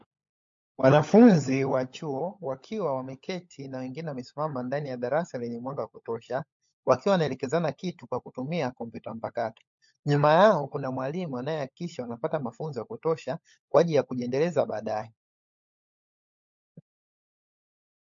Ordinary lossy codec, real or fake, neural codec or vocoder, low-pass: MP3, 48 kbps; fake; codec, 16 kHz, 16 kbps, FunCodec, trained on LibriTTS, 50 frames a second; 7.2 kHz